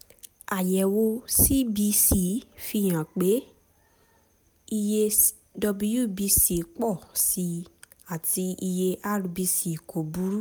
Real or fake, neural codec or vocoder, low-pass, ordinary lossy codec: real; none; none; none